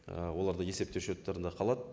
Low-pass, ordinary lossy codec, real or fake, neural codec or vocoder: none; none; real; none